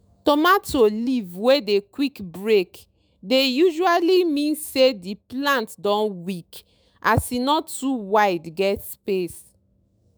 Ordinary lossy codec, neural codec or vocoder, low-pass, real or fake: none; autoencoder, 48 kHz, 128 numbers a frame, DAC-VAE, trained on Japanese speech; none; fake